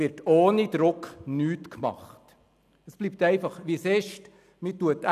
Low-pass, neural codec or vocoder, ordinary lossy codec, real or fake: 14.4 kHz; none; none; real